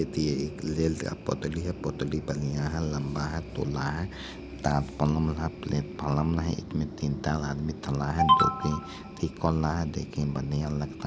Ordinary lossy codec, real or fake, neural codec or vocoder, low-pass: none; real; none; none